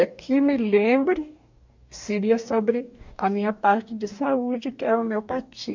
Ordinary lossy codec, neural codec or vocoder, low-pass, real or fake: MP3, 64 kbps; codec, 44.1 kHz, 2.6 kbps, DAC; 7.2 kHz; fake